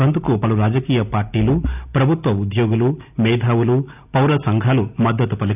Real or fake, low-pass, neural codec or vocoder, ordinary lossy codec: real; 3.6 kHz; none; none